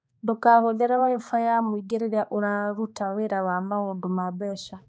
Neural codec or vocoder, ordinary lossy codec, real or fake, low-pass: codec, 16 kHz, 2 kbps, X-Codec, HuBERT features, trained on balanced general audio; none; fake; none